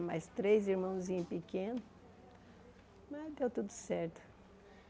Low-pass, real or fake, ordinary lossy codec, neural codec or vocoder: none; real; none; none